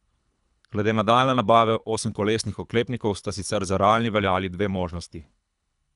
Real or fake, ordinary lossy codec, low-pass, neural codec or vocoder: fake; none; 10.8 kHz; codec, 24 kHz, 3 kbps, HILCodec